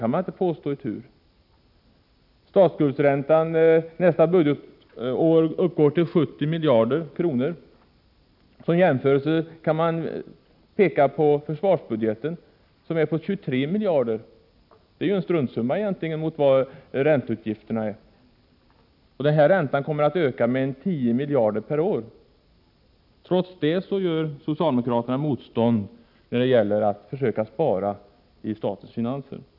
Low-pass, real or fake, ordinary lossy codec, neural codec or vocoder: 5.4 kHz; real; none; none